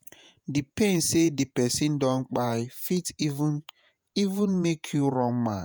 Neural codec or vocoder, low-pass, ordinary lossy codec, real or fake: vocoder, 48 kHz, 128 mel bands, Vocos; none; none; fake